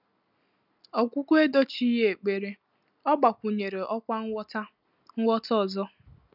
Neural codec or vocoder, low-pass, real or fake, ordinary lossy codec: none; 5.4 kHz; real; none